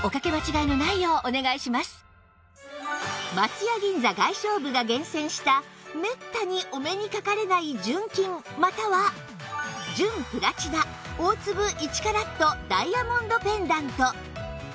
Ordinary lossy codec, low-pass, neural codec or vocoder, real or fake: none; none; none; real